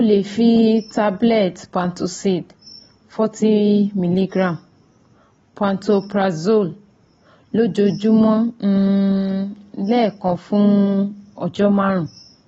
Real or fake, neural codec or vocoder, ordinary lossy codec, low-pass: real; none; AAC, 24 kbps; 19.8 kHz